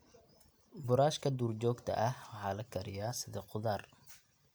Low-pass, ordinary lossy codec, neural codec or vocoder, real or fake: none; none; none; real